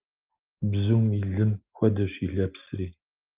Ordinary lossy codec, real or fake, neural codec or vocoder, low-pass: Opus, 32 kbps; real; none; 3.6 kHz